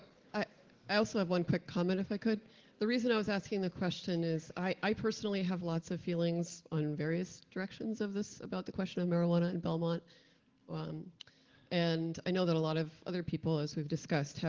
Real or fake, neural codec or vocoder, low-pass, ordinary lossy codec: real; none; 7.2 kHz; Opus, 16 kbps